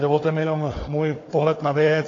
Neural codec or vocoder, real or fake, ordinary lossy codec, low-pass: codec, 16 kHz, 4 kbps, FunCodec, trained on Chinese and English, 50 frames a second; fake; AAC, 32 kbps; 7.2 kHz